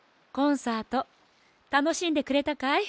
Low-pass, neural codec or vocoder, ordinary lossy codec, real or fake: none; none; none; real